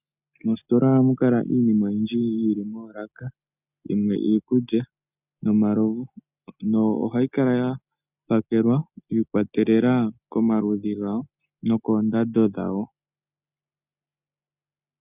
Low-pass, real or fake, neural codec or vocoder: 3.6 kHz; real; none